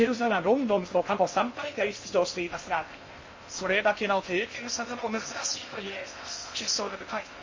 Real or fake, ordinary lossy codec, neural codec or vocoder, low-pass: fake; MP3, 32 kbps; codec, 16 kHz in and 24 kHz out, 0.6 kbps, FocalCodec, streaming, 2048 codes; 7.2 kHz